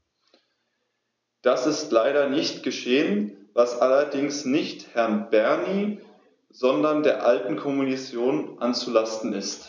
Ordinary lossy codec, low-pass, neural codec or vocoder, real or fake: none; none; none; real